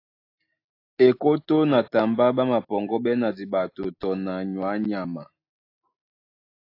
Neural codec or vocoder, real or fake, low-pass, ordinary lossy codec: none; real; 5.4 kHz; AAC, 32 kbps